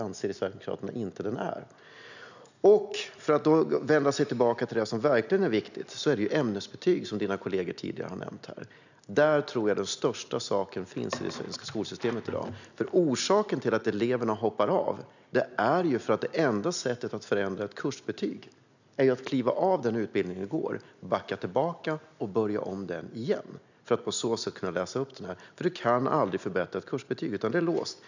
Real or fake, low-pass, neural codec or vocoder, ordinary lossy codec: real; 7.2 kHz; none; none